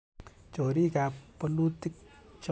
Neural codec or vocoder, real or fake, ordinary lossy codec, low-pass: none; real; none; none